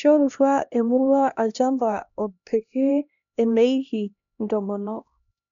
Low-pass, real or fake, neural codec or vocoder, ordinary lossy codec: 7.2 kHz; fake; codec, 16 kHz, 1 kbps, X-Codec, HuBERT features, trained on LibriSpeech; none